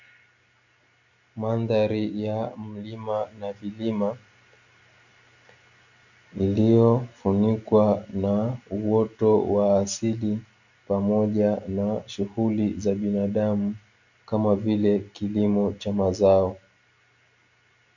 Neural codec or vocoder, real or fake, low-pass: none; real; 7.2 kHz